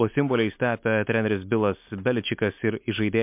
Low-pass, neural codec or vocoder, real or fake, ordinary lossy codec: 3.6 kHz; none; real; MP3, 32 kbps